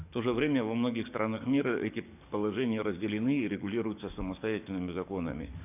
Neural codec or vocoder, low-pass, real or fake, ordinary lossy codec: codec, 44.1 kHz, 7.8 kbps, DAC; 3.6 kHz; fake; none